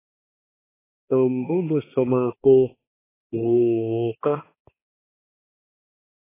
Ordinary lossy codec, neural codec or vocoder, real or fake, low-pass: AAC, 16 kbps; codec, 16 kHz, 2 kbps, X-Codec, HuBERT features, trained on balanced general audio; fake; 3.6 kHz